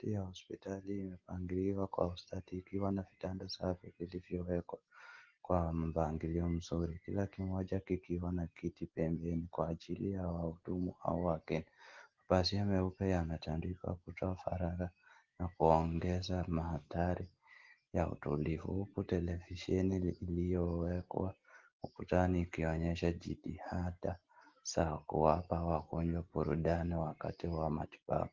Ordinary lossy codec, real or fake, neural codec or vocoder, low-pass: Opus, 16 kbps; real; none; 7.2 kHz